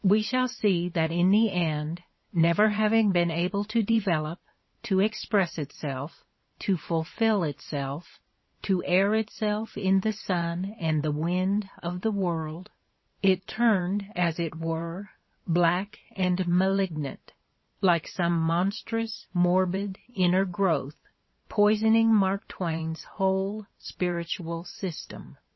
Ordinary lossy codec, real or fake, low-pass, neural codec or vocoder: MP3, 24 kbps; fake; 7.2 kHz; vocoder, 44.1 kHz, 128 mel bands every 512 samples, BigVGAN v2